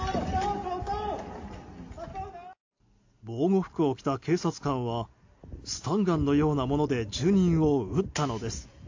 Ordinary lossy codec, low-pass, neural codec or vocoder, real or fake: AAC, 48 kbps; 7.2 kHz; none; real